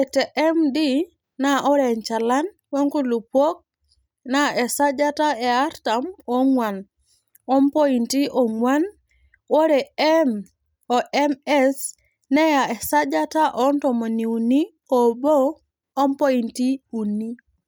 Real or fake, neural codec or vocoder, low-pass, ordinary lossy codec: real; none; none; none